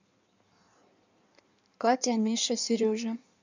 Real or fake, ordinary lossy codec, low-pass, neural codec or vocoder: fake; none; 7.2 kHz; codec, 16 kHz in and 24 kHz out, 1.1 kbps, FireRedTTS-2 codec